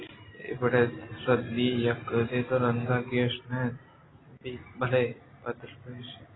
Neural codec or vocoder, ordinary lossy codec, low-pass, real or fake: none; AAC, 16 kbps; 7.2 kHz; real